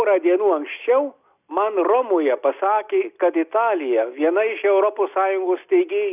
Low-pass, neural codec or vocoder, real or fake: 3.6 kHz; none; real